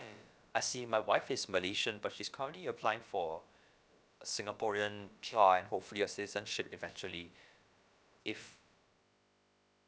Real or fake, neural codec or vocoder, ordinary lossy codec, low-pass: fake; codec, 16 kHz, about 1 kbps, DyCAST, with the encoder's durations; none; none